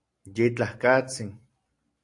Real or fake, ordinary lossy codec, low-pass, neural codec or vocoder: real; AAC, 48 kbps; 10.8 kHz; none